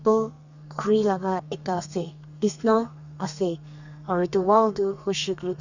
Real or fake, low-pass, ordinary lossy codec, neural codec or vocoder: fake; 7.2 kHz; none; codec, 32 kHz, 1.9 kbps, SNAC